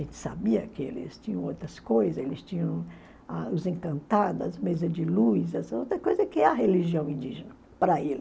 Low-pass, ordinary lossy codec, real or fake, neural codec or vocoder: none; none; real; none